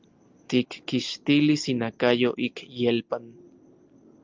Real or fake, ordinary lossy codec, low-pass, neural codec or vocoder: real; Opus, 32 kbps; 7.2 kHz; none